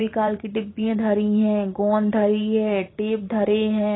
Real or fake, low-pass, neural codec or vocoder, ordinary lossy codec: fake; 7.2 kHz; vocoder, 44.1 kHz, 128 mel bands every 512 samples, BigVGAN v2; AAC, 16 kbps